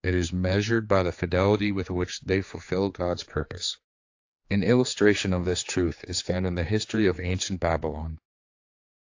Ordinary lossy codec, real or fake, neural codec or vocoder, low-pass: AAC, 48 kbps; fake; codec, 16 kHz, 4 kbps, X-Codec, HuBERT features, trained on general audio; 7.2 kHz